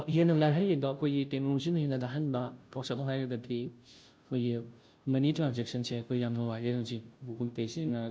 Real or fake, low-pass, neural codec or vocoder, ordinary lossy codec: fake; none; codec, 16 kHz, 0.5 kbps, FunCodec, trained on Chinese and English, 25 frames a second; none